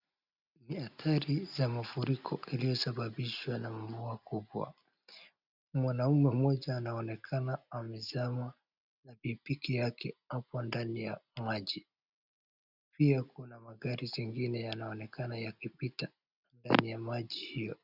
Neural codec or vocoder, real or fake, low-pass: none; real; 5.4 kHz